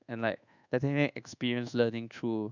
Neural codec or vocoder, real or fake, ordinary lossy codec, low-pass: codec, 24 kHz, 1.2 kbps, DualCodec; fake; none; 7.2 kHz